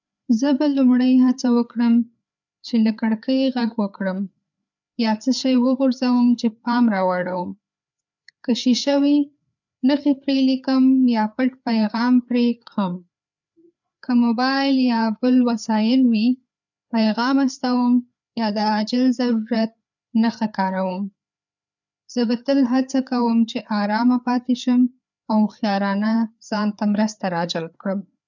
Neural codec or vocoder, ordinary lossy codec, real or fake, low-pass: codec, 16 kHz, 4 kbps, FreqCodec, larger model; none; fake; 7.2 kHz